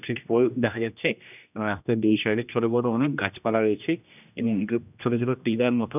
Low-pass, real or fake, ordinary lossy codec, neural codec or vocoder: 3.6 kHz; fake; none; codec, 16 kHz, 1 kbps, X-Codec, HuBERT features, trained on general audio